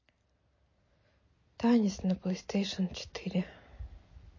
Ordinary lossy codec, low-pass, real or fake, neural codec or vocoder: MP3, 32 kbps; 7.2 kHz; real; none